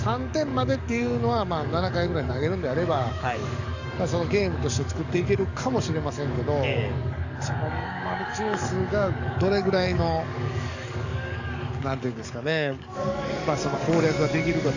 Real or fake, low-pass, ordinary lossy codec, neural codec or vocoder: fake; 7.2 kHz; none; codec, 44.1 kHz, 7.8 kbps, DAC